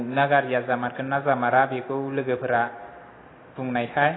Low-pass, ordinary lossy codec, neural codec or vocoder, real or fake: 7.2 kHz; AAC, 16 kbps; none; real